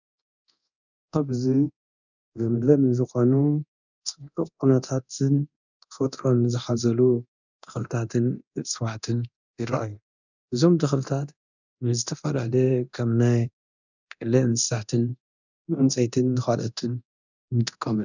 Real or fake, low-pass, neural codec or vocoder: fake; 7.2 kHz; codec, 24 kHz, 0.9 kbps, DualCodec